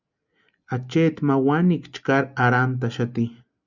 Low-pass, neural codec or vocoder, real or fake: 7.2 kHz; none; real